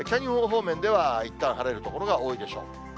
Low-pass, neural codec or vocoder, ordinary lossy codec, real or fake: none; none; none; real